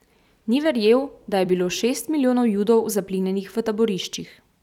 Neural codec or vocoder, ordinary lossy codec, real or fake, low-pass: vocoder, 44.1 kHz, 128 mel bands every 256 samples, BigVGAN v2; none; fake; 19.8 kHz